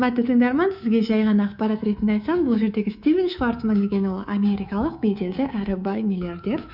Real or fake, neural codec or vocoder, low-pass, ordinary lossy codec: fake; codec, 24 kHz, 3.1 kbps, DualCodec; 5.4 kHz; none